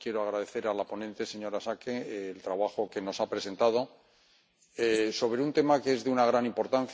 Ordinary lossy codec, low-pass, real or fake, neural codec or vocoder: none; none; real; none